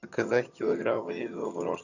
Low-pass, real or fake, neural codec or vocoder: 7.2 kHz; fake; vocoder, 22.05 kHz, 80 mel bands, HiFi-GAN